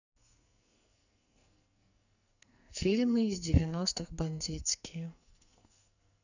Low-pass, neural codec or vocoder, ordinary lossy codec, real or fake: 7.2 kHz; codec, 44.1 kHz, 2.6 kbps, SNAC; none; fake